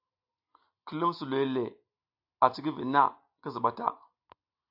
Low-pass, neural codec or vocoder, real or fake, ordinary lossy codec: 5.4 kHz; none; real; AAC, 48 kbps